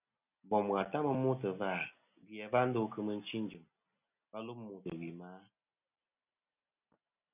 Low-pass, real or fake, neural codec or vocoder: 3.6 kHz; real; none